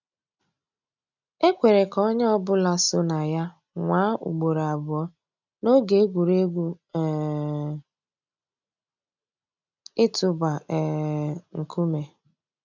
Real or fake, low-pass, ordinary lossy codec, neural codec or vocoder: real; 7.2 kHz; none; none